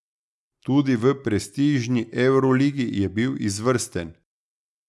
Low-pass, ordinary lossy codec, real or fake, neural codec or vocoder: none; none; real; none